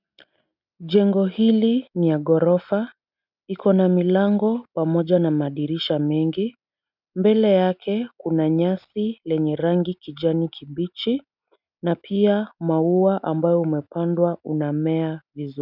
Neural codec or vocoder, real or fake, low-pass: none; real; 5.4 kHz